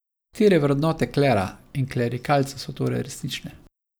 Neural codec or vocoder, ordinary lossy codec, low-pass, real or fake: none; none; none; real